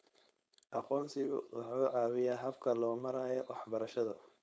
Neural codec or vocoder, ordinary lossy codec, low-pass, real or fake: codec, 16 kHz, 4.8 kbps, FACodec; none; none; fake